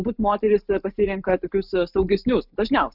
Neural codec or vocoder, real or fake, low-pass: none; real; 5.4 kHz